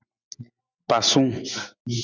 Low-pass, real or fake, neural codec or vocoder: 7.2 kHz; real; none